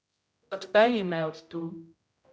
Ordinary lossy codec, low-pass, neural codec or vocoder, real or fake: none; none; codec, 16 kHz, 0.5 kbps, X-Codec, HuBERT features, trained on general audio; fake